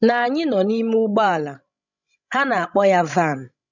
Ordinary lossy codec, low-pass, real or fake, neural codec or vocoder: none; 7.2 kHz; fake; codec, 16 kHz, 16 kbps, FreqCodec, larger model